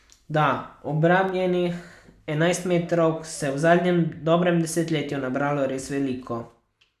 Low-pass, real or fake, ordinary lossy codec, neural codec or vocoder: 14.4 kHz; real; none; none